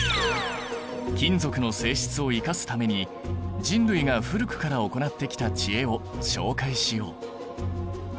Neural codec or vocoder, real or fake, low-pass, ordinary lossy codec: none; real; none; none